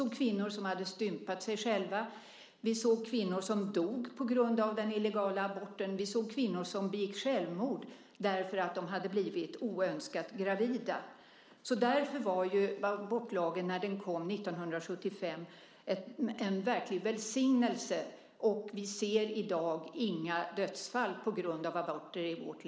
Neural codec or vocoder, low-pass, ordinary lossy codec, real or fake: none; none; none; real